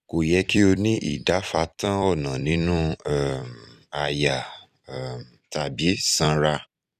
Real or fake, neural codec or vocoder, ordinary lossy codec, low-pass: fake; vocoder, 44.1 kHz, 128 mel bands every 256 samples, BigVGAN v2; none; 14.4 kHz